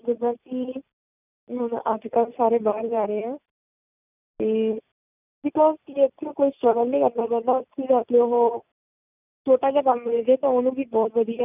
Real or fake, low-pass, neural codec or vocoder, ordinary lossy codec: real; 3.6 kHz; none; none